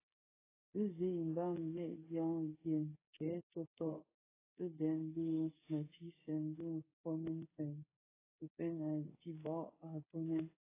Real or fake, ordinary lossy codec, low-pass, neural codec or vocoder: fake; AAC, 16 kbps; 3.6 kHz; codec, 16 kHz, 8 kbps, FreqCodec, smaller model